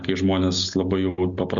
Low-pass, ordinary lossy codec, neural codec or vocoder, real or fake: 7.2 kHz; Opus, 64 kbps; none; real